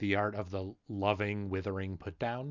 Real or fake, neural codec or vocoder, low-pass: real; none; 7.2 kHz